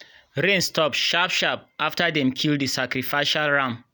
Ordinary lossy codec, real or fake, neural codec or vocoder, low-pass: none; real; none; none